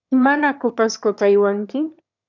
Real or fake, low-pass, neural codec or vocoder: fake; 7.2 kHz; autoencoder, 22.05 kHz, a latent of 192 numbers a frame, VITS, trained on one speaker